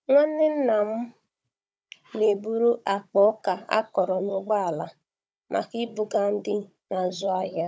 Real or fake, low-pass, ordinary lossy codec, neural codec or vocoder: fake; none; none; codec, 16 kHz, 16 kbps, FunCodec, trained on Chinese and English, 50 frames a second